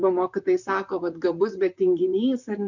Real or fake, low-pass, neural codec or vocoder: fake; 7.2 kHz; vocoder, 44.1 kHz, 128 mel bands, Pupu-Vocoder